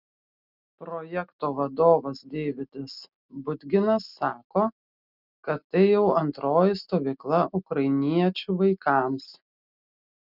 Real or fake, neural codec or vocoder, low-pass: real; none; 5.4 kHz